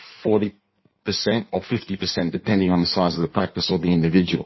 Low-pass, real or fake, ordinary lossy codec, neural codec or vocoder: 7.2 kHz; fake; MP3, 24 kbps; codec, 16 kHz in and 24 kHz out, 1.1 kbps, FireRedTTS-2 codec